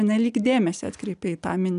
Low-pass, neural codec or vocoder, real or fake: 10.8 kHz; none; real